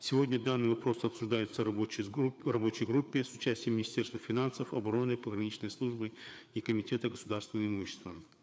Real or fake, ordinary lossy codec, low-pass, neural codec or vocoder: fake; none; none; codec, 16 kHz, 4 kbps, FreqCodec, larger model